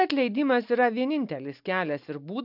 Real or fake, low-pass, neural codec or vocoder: real; 5.4 kHz; none